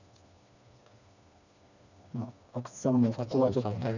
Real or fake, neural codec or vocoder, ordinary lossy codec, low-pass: fake; codec, 16 kHz, 2 kbps, FreqCodec, smaller model; none; 7.2 kHz